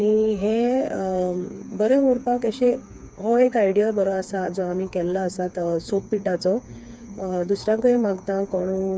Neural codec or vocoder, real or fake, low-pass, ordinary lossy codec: codec, 16 kHz, 4 kbps, FreqCodec, smaller model; fake; none; none